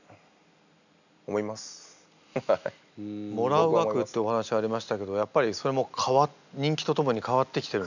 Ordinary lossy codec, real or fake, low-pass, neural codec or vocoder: none; real; 7.2 kHz; none